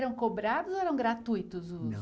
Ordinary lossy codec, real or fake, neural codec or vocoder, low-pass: none; real; none; none